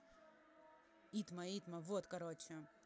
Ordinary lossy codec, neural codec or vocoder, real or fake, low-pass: none; none; real; none